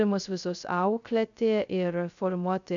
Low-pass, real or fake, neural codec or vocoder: 7.2 kHz; fake; codec, 16 kHz, 0.2 kbps, FocalCodec